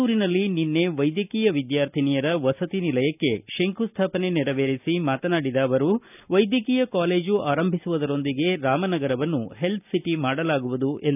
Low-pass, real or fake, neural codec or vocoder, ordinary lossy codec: 3.6 kHz; real; none; none